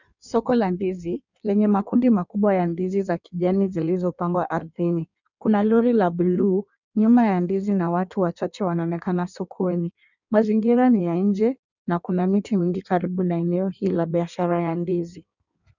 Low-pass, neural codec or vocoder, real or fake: 7.2 kHz; codec, 16 kHz in and 24 kHz out, 1.1 kbps, FireRedTTS-2 codec; fake